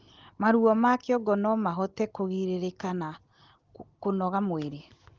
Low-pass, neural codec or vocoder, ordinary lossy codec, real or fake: 7.2 kHz; none; Opus, 16 kbps; real